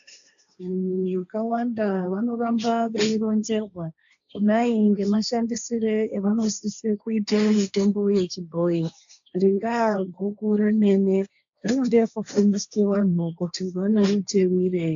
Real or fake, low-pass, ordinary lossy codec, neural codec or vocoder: fake; 7.2 kHz; AAC, 64 kbps; codec, 16 kHz, 1.1 kbps, Voila-Tokenizer